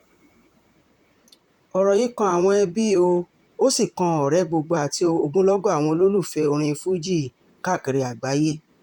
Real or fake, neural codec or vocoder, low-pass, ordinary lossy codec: fake; vocoder, 44.1 kHz, 128 mel bands, Pupu-Vocoder; 19.8 kHz; none